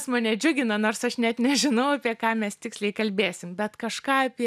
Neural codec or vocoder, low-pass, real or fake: none; 14.4 kHz; real